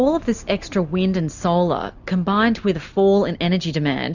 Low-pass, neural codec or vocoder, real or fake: 7.2 kHz; none; real